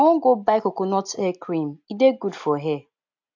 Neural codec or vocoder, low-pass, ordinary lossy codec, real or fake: none; 7.2 kHz; AAC, 48 kbps; real